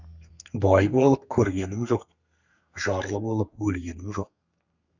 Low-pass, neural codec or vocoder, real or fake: 7.2 kHz; codec, 32 kHz, 1.9 kbps, SNAC; fake